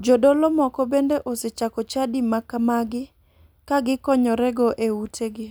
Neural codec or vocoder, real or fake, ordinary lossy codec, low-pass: none; real; none; none